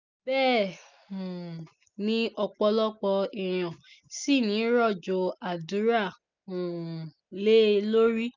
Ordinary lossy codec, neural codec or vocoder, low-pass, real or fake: none; none; 7.2 kHz; real